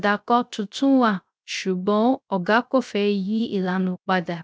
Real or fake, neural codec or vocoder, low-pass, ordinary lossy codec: fake; codec, 16 kHz, 0.3 kbps, FocalCodec; none; none